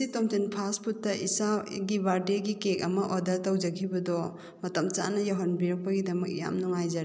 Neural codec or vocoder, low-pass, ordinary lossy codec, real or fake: none; none; none; real